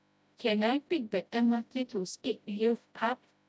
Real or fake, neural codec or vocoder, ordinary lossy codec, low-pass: fake; codec, 16 kHz, 0.5 kbps, FreqCodec, smaller model; none; none